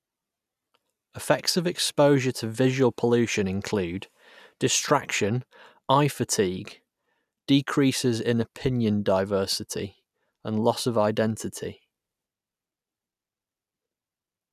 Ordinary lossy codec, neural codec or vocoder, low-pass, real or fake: none; none; 14.4 kHz; real